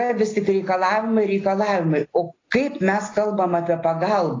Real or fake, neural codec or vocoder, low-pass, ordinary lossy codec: real; none; 7.2 kHz; AAC, 32 kbps